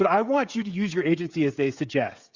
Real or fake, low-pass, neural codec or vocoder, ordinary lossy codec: fake; 7.2 kHz; codec, 16 kHz, 16 kbps, FreqCodec, smaller model; Opus, 64 kbps